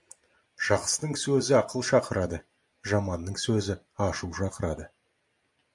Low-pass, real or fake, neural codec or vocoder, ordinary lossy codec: 10.8 kHz; real; none; MP3, 64 kbps